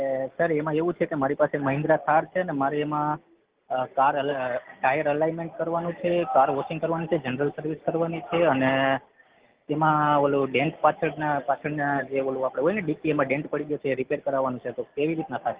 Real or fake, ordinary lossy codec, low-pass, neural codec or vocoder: real; Opus, 16 kbps; 3.6 kHz; none